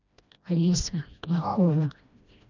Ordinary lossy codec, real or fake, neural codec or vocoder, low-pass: none; fake; codec, 16 kHz, 1 kbps, FreqCodec, smaller model; 7.2 kHz